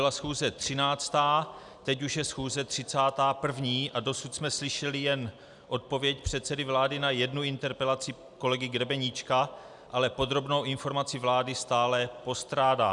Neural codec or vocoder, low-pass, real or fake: none; 10.8 kHz; real